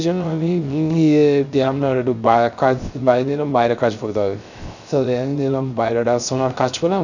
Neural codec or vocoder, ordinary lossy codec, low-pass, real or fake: codec, 16 kHz, 0.3 kbps, FocalCodec; none; 7.2 kHz; fake